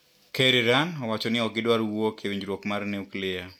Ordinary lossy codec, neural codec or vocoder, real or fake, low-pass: none; none; real; 19.8 kHz